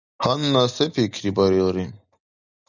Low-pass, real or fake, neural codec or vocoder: 7.2 kHz; real; none